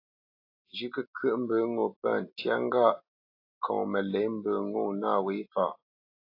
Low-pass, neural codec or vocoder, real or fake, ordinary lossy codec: 5.4 kHz; none; real; AAC, 32 kbps